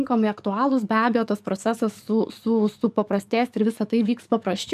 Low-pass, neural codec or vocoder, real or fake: 14.4 kHz; codec, 44.1 kHz, 7.8 kbps, DAC; fake